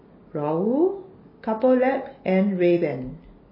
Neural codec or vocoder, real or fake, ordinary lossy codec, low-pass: none; real; MP3, 24 kbps; 5.4 kHz